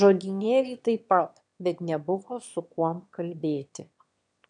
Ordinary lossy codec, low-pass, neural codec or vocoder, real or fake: MP3, 96 kbps; 9.9 kHz; autoencoder, 22.05 kHz, a latent of 192 numbers a frame, VITS, trained on one speaker; fake